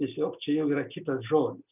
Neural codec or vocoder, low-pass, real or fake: none; 3.6 kHz; real